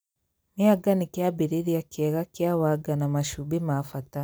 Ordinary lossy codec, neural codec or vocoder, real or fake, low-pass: none; none; real; none